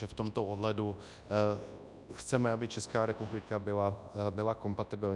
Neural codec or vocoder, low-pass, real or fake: codec, 24 kHz, 0.9 kbps, WavTokenizer, large speech release; 10.8 kHz; fake